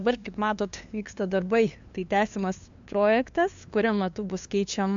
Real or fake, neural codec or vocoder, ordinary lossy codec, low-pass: fake; codec, 16 kHz, 2 kbps, FunCodec, trained on LibriTTS, 25 frames a second; MP3, 64 kbps; 7.2 kHz